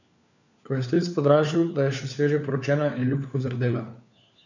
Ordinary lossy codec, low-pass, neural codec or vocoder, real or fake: none; 7.2 kHz; codec, 16 kHz, 4 kbps, FunCodec, trained on LibriTTS, 50 frames a second; fake